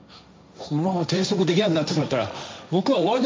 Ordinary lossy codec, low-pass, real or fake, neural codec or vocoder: none; none; fake; codec, 16 kHz, 1.1 kbps, Voila-Tokenizer